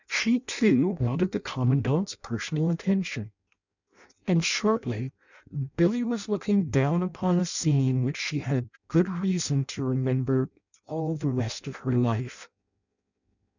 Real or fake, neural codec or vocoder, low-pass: fake; codec, 16 kHz in and 24 kHz out, 0.6 kbps, FireRedTTS-2 codec; 7.2 kHz